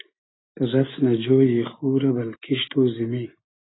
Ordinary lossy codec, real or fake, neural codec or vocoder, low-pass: AAC, 16 kbps; real; none; 7.2 kHz